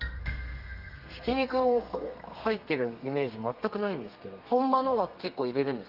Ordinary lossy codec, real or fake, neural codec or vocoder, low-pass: Opus, 32 kbps; fake; codec, 32 kHz, 1.9 kbps, SNAC; 5.4 kHz